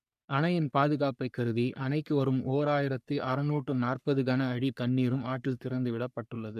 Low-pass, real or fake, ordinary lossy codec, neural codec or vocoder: 14.4 kHz; fake; none; codec, 44.1 kHz, 3.4 kbps, Pupu-Codec